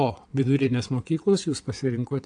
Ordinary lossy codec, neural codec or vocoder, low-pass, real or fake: AAC, 64 kbps; vocoder, 22.05 kHz, 80 mel bands, WaveNeXt; 9.9 kHz; fake